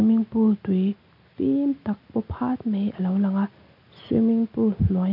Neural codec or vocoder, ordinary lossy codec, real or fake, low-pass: none; none; real; 5.4 kHz